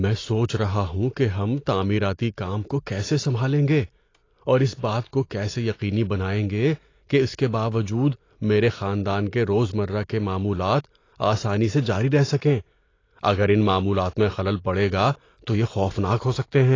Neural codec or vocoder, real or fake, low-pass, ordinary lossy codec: none; real; 7.2 kHz; AAC, 32 kbps